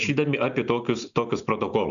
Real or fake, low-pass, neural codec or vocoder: real; 7.2 kHz; none